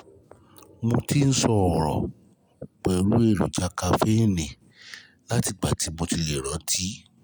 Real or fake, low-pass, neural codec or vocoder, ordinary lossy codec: real; none; none; none